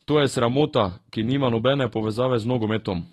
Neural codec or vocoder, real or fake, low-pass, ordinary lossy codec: autoencoder, 48 kHz, 128 numbers a frame, DAC-VAE, trained on Japanese speech; fake; 19.8 kHz; AAC, 32 kbps